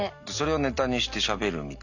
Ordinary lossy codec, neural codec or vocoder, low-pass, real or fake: none; none; 7.2 kHz; real